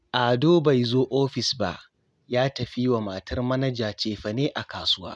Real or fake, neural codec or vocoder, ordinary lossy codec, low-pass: real; none; none; none